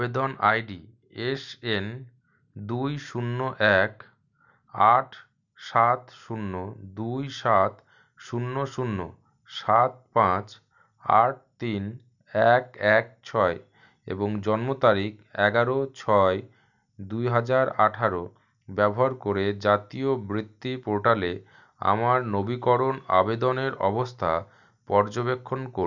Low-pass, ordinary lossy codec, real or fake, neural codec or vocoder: 7.2 kHz; none; real; none